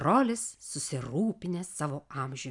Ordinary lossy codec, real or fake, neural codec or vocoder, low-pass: MP3, 96 kbps; real; none; 10.8 kHz